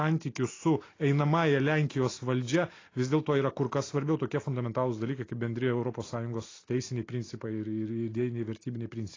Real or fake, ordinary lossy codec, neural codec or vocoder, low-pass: real; AAC, 32 kbps; none; 7.2 kHz